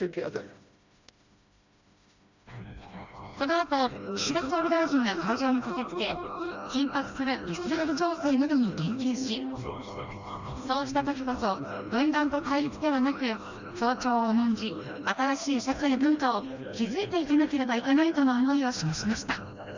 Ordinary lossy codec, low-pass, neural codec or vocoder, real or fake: Opus, 64 kbps; 7.2 kHz; codec, 16 kHz, 1 kbps, FreqCodec, smaller model; fake